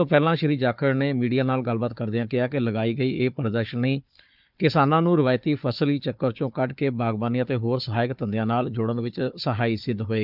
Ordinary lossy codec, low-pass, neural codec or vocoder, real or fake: none; 5.4 kHz; codec, 16 kHz, 4 kbps, FunCodec, trained on Chinese and English, 50 frames a second; fake